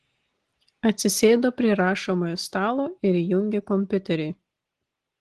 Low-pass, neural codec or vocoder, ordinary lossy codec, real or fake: 10.8 kHz; none; Opus, 16 kbps; real